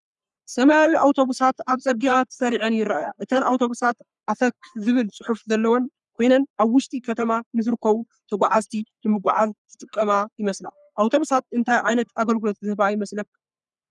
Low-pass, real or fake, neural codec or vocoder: 10.8 kHz; fake; codec, 44.1 kHz, 3.4 kbps, Pupu-Codec